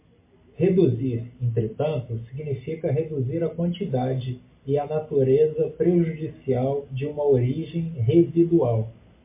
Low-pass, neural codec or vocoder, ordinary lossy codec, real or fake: 3.6 kHz; none; MP3, 24 kbps; real